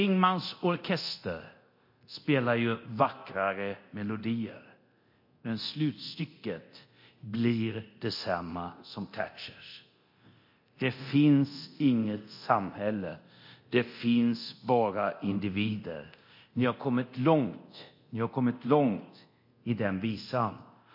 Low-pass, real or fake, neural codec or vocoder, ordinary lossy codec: 5.4 kHz; fake; codec, 24 kHz, 0.9 kbps, DualCodec; MP3, 32 kbps